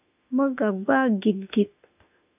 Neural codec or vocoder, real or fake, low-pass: autoencoder, 48 kHz, 32 numbers a frame, DAC-VAE, trained on Japanese speech; fake; 3.6 kHz